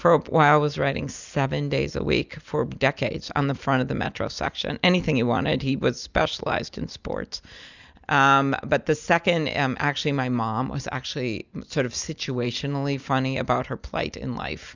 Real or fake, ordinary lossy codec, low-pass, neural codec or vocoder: real; Opus, 64 kbps; 7.2 kHz; none